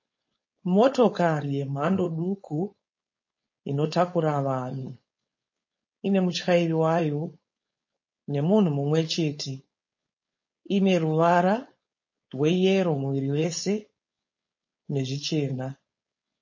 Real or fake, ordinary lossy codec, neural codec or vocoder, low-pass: fake; MP3, 32 kbps; codec, 16 kHz, 4.8 kbps, FACodec; 7.2 kHz